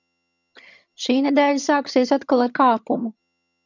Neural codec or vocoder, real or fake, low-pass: vocoder, 22.05 kHz, 80 mel bands, HiFi-GAN; fake; 7.2 kHz